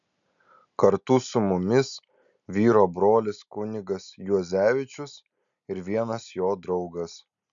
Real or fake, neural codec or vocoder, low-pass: real; none; 7.2 kHz